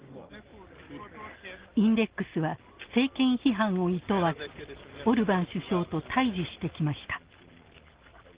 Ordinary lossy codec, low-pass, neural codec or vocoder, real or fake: Opus, 16 kbps; 3.6 kHz; none; real